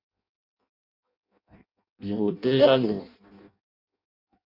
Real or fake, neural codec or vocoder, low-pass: fake; codec, 16 kHz in and 24 kHz out, 0.6 kbps, FireRedTTS-2 codec; 5.4 kHz